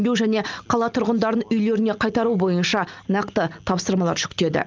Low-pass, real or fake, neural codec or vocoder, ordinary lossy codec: 7.2 kHz; real; none; Opus, 24 kbps